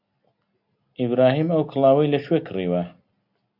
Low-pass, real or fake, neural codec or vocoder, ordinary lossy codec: 5.4 kHz; real; none; AAC, 48 kbps